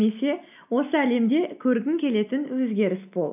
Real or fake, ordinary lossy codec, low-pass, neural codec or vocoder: real; AAC, 32 kbps; 3.6 kHz; none